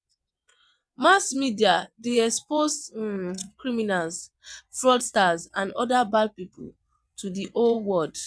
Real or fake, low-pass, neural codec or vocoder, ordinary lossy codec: fake; none; vocoder, 22.05 kHz, 80 mel bands, WaveNeXt; none